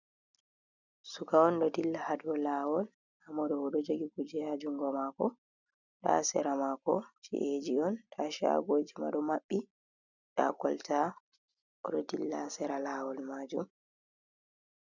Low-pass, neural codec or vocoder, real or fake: 7.2 kHz; none; real